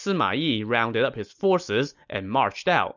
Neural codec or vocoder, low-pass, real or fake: none; 7.2 kHz; real